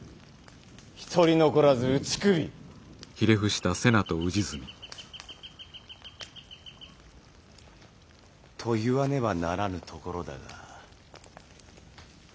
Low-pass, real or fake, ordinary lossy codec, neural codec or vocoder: none; real; none; none